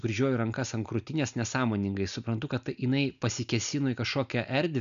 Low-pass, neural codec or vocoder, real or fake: 7.2 kHz; none; real